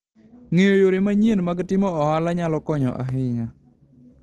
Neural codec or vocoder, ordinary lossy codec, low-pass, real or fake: none; Opus, 16 kbps; 10.8 kHz; real